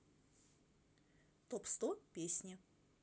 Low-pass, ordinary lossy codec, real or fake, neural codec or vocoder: none; none; real; none